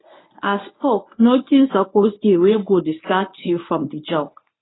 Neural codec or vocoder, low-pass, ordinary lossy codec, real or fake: codec, 24 kHz, 0.9 kbps, WavTokenizer, medium speech release version 1; 7.2 kHz; AAC, 16 kbps; fake